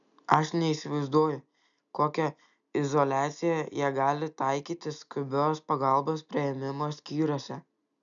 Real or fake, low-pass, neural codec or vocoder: real; 7.2 kHz; none